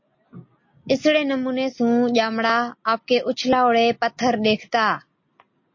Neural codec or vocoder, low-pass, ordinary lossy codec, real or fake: none; 7.2 kHz; MP3, 32 kbps; real